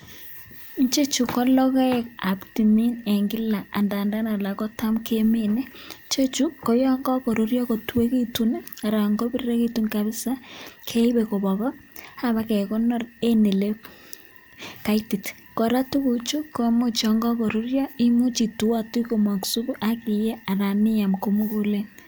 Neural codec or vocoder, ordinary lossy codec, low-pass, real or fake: none; none; none; real